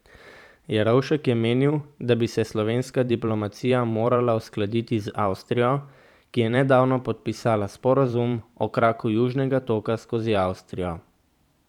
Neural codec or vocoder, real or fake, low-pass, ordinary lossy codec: vocoder, 44.1 kHz, 128 mel bands every 512 samples, BigVGAN v2; fake; 19.8 kHz; none